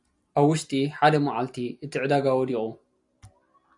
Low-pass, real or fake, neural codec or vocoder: 10.8 kHz; real; none